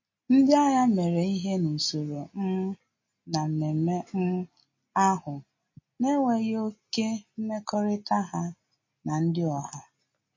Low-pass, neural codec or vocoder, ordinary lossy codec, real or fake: 7.2 kHz; none; MP3, 32 kbps; real